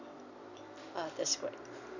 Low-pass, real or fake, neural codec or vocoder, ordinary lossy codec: 7.2 kHz; real; none; none